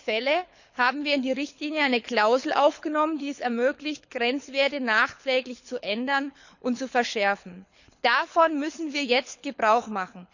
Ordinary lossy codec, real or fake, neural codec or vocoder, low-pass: none; fake; codec, 24 kHz, 6 kbps, HILCodec; 7.2 kHz